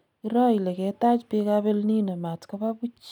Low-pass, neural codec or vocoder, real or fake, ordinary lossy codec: 19.8 kHz; none; real; none